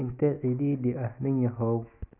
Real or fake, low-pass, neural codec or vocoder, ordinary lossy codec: real; 3.6 kHz; none; none